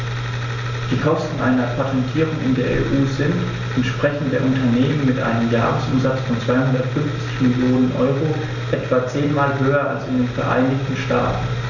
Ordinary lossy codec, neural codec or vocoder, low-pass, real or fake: none; none; 7.2 kHz; real